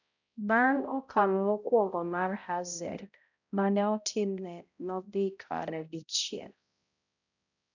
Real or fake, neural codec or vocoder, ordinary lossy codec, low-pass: fake; codec, 16 kHz, 0.5 kbps, X-Codec, HuBERT features, trained on balanced general audio; none; 7.2 kHz